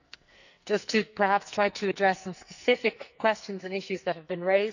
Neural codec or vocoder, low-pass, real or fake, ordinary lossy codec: codec, 44.1 kHz, 2.6 kbps, SNAC; 7.2 kHz; fake; none